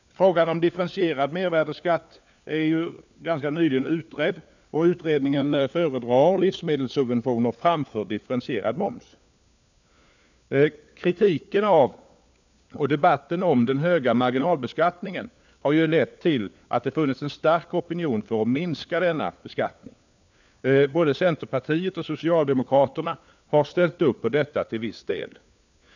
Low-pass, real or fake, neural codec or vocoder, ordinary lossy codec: 7.2 kHz; fake; codec, 16 kHz, 4 kbps, FunCodec, trained on LibriTTS, 50 frames a second; none